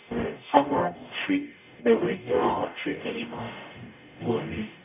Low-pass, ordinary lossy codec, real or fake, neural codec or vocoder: 3.6 kHz; none; fake; codec, 44.1 kHz, 0.9 kbps, DAC